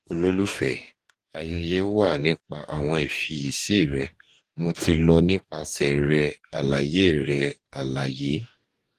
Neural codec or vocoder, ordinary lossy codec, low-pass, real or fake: codec, 44.1 kHz, 2.6 kbps, DAC; Opus, 24 kbps; 14.4 kHz; fake